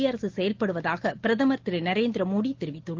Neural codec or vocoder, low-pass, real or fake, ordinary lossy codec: none; 7.2 kHz; real; Opus, 16 kbps